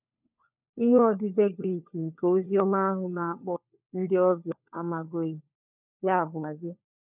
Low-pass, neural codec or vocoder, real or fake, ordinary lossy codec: 3.6 kHz; codec, 16 kHz, 4 kbps, FunCodec, trained on LibriTTS, 50 frames a second; fake; none